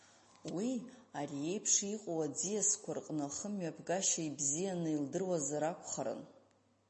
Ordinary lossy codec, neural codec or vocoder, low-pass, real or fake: MP3, 32 kbps; none; 10.8 kHz; real